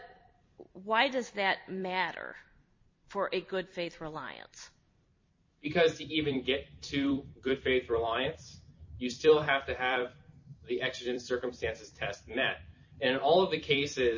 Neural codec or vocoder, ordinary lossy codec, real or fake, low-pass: vocoder, 44.1 kHz, 128 mel bands every 256 samples, BigVGAN v2; MP3, 32 kbps; fake; 7.2 kHz